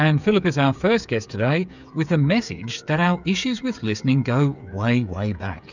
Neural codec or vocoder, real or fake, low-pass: codec, 16 kHz, 8 kbps, FreqCodec, smaller model; fake; 7.2 kHz